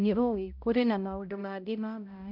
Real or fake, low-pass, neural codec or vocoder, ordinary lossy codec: fake; 5.4 kHz; codec, 16 kHz, 0.5 kbps, X-Codec, HuBERT features, trained on balanced general audio; none